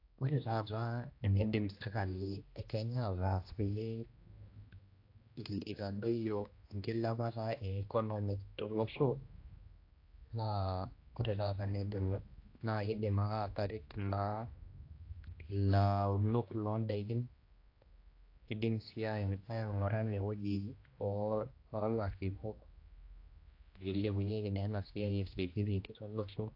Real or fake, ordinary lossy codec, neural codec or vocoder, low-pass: fake; AAC, 32 kbps; codec, 16 kHz, 1 kbps, X-Codec, HuBERT features, trained on general audio; 5.4 kHz